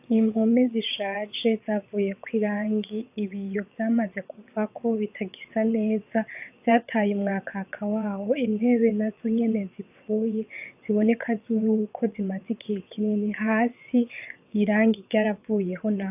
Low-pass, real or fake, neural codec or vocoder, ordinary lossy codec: 3.6 kHz; fake; vocoder, 22.05 kHz, 80 mel bands, WaveNeXt; AAC, 32 kbps